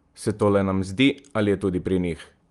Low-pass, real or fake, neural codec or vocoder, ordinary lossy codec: 10.8 kHz; real; none; Opus, 32 kbps